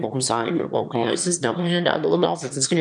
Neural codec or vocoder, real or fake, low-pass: autoencoder, 22.05 kHz, a latent of 192 numbers a frame, VITS, trained on one speaker; fake; 9.9 kHz